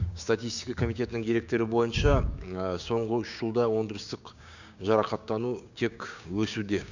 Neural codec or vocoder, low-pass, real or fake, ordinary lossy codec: codec, 16 kHz, 6 kbps, DAC; 7.2 kHz; fake; none